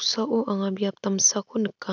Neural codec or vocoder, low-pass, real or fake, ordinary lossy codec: none; 7.2 kHz; real; none